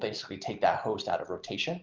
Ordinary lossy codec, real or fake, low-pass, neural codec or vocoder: Opus, 24 kbps; real; 7.2 kHz; none